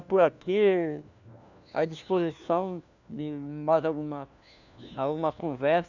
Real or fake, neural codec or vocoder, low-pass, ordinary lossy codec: fake; codec, 16 kHz, 1 kbps, FunCodec, trained on LibriTTS, 50 frames a second; 7.2 kHz; none